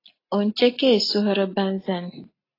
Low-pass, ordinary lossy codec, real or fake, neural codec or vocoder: 5.4 kHz; AAC, 24 kbps; real; none